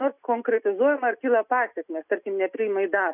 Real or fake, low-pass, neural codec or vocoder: real; 3.6 kHz; none